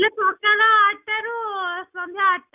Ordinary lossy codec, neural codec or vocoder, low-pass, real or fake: none; none; 3.6 kHz; real